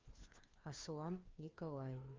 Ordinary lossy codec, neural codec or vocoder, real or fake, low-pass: Opus, 32 kbps; codec, 16 kHz, 2 kbps, FreqCodec, larger model; fake; 7.2 kHz